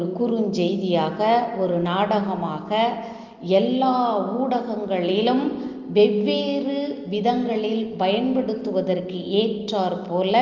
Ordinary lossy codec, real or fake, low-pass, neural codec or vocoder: Opus, 32 kbps; real; 7.2 kHz; none